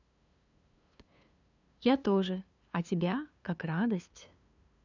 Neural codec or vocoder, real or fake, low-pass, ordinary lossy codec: codec, 16 kHz, 2 kbps, FunCodec, trained on LibriTTS, 25 frames a second; fake; 7.2 kHz; none